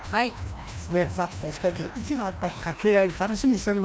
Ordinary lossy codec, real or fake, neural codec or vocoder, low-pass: none; fake; codec, 16 kHz, 1 kbps, FreqCodec, larger model; none